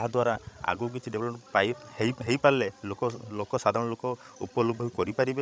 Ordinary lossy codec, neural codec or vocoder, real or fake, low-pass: none; codec, 16 kHz, 16 kbps, FreqCodec, larger model; fake; none